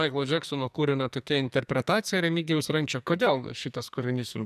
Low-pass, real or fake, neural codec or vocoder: 14.4 kHz; fake; codec, 44.1 kHz, 2.6 kbps, SNAC